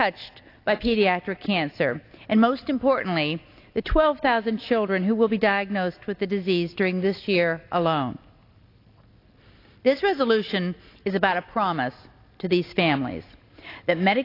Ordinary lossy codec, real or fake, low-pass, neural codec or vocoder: AAC, 32 kbps; real; 5.4 kHz; none